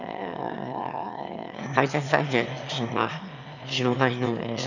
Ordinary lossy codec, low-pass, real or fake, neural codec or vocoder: none; 7.2 kHz; fake; autoencoder, 22.05 kHz, a latent of 192 numbers a frame, VITS, trained on one speaker